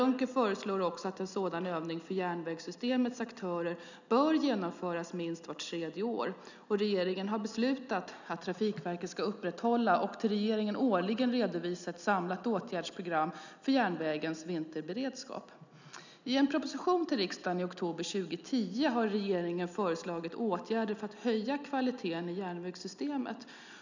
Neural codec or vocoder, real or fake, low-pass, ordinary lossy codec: none; real; 7.2 kHz; none